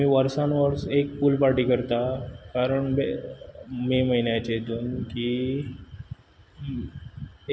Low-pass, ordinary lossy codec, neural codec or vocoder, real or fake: none; none; none; real